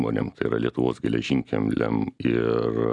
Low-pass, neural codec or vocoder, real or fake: 10.8 kHz; none; real